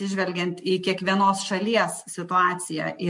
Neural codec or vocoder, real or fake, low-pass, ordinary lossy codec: none; real; 10.8 kHz; MP3, 64 kbps